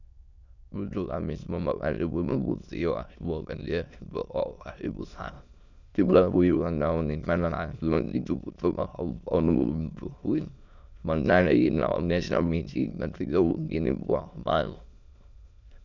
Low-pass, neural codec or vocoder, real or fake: 7.2 kHz; autoencoder, 22.05 kHz, a latent of 192 numbers a frame, VITS, trained on many speakers; fake